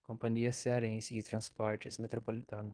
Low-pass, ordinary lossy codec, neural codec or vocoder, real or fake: 9.9 kHz; Opus, 24 kbps; codec, 16 kHz in and 24 kHz out, 0.9 kbps, LongCat-Audio-Codec, fine tuned four codebook decoder; fake